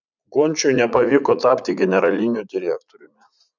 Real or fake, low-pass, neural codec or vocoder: fake; 7.2 kHz; vocoder, 44.1 kHz, 80 mel bands, Vocos